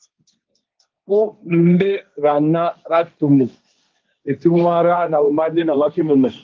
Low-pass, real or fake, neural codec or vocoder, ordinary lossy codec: 7.2 kHz; fake; codec, 16 kHz, 1.1 kbps, Voila-Tokenizer; Opus, 32 kbps